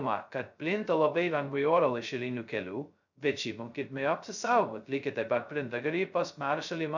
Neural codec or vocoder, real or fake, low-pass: codec, 16 kHz, 0.2 kbps, FocalCodec; fake; 7.2 kHz